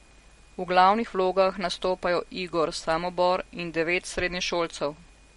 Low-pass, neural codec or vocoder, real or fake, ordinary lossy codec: 19.8 kHz; none; real; MP3, 48 kbps